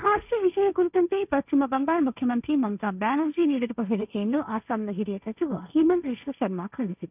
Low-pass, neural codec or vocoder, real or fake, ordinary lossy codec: 3.6 kHz; codec, 16 kHz, 1.1 kbps, Voila-Tokenizer; fake; none